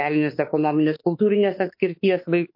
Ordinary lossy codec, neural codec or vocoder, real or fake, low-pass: MP3, 32 kbps; autoencoder, 48 kHz, 32 numbers a frame, DAC-VAE, trained on Japanese speech; fake; 5.4 kHz